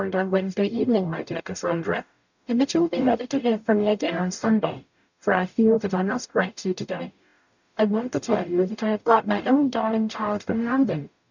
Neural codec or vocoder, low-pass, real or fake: codec, 44.1 kHz, 0.9 kbps, DAC; 7.2 kHz; fake